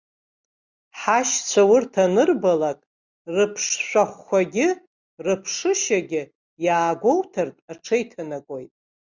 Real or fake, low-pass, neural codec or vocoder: real; 7.2 kHz; none